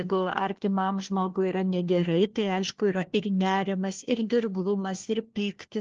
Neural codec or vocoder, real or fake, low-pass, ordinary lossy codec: codec, 16 kHz, 1 kbps, FunCodec, trained on LibriTTS, 50 frames a second; fake; 7.2 kHz; Opus, 16 kbps